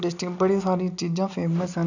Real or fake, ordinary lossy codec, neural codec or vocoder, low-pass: real; none; none; 7.2 kHz